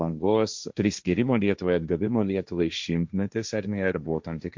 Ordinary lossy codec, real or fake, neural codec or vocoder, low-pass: MP3, 64 kbps; fake; codec, 16 kHz, 1.1 kbps, Voila-Tokenizer; 7.2 kHz